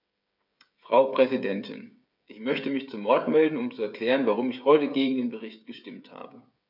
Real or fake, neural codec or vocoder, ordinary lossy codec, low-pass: fake; codec, 16 kHz, 16 kbps, FreqCodec, smaller model; none; 5.4 kHz